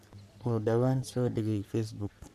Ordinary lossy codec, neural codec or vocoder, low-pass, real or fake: none; codec, 44.1 kHz, 3.4 kbps, Pupu-Codec; 14.4 kHz; fake